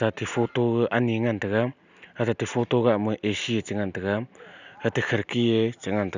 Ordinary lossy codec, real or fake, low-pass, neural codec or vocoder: none; real; 7.2 kHz; none